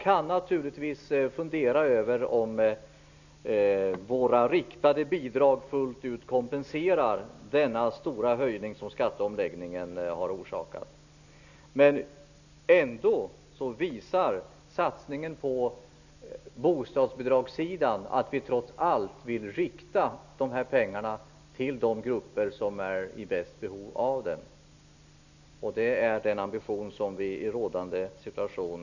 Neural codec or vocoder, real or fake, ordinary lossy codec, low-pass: none; real; none; 7.2 kHz